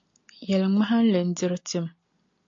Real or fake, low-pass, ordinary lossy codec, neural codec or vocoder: real; 7.2 kHz; AAC, 48 kbps; none